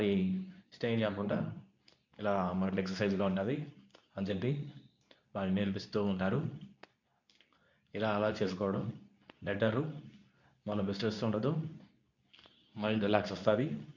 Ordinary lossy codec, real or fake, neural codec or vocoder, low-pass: none; fake; codec, 24 kHz, 0.9 kbps, WavTokenizer, medium speech release version 2; 7.2 kHz